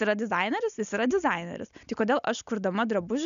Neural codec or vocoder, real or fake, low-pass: none; real; 7.2 kHz